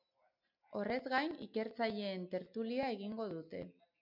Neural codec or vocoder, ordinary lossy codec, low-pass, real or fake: none; MP3, 48 kbps; 5.4 kHz; real